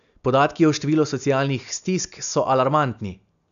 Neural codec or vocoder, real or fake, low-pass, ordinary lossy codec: none; real; 7.2 kHz; none